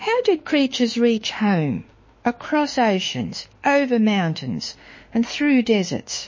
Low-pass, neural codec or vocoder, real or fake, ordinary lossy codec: 7.2 kHz; autoencoder, 48 kHz, 32 numbers a frame, DAC-VAE, trained on Japanese speech; fake; MP3, 32 kbps